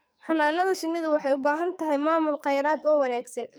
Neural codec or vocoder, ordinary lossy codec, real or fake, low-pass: codec, 44.1 kHz, 2.6 kbps, SNAC; none; fake; none